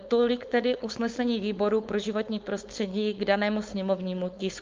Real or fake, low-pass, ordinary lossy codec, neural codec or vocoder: fake; 7.2 kHz; Opus, 24 kbps; codec, 16 kHz, 4.8 kbps, FACodec